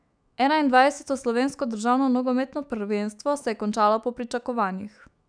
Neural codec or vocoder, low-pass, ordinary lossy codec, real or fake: autoencoder, 48 kHz, 128 numbers a frame, DAC-VAE, trained on Japanese speech; 9.9 kHz; none; fake